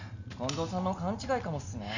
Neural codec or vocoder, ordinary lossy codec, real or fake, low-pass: none; none; real; 7.2 kHz